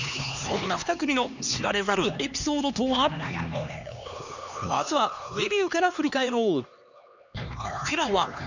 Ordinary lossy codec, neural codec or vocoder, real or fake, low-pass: none; codec, 16 kHz, 2 kbps, X-Codec, HuBERT features, trained on LibriSpeech; fake; 7.2 kHz